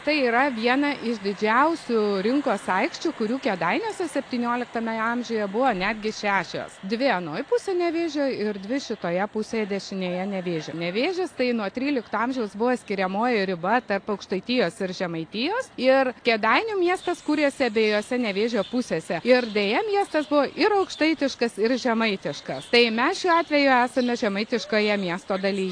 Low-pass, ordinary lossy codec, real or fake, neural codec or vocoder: 9.9 kHz; AAC, 48 kbps; real; none